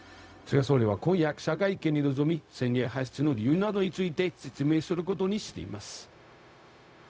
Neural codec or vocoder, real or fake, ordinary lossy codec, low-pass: codec, 16 kHz, 0.4 kbps, LongCat-Audio-Codec; fake; none; none